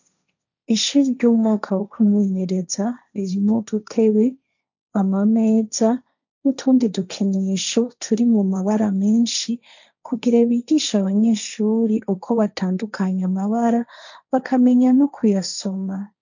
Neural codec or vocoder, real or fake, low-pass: codec, 16 kHz, 1.1 kbps, Voila-Tokenizer; fake; 7.2 kHz